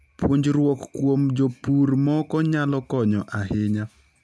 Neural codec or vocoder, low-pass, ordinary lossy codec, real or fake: none; none; none; real